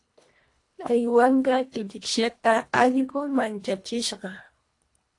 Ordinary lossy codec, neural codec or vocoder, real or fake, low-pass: AAC, 48 kbps; codec, 24 kHz, 1.5 kbps, HILCodec; fake; 10.8 kHz